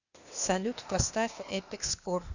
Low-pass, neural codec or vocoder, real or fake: 7.2 kHz; codec, 16 kHz, 0.8 kbps, ZipCodec; fake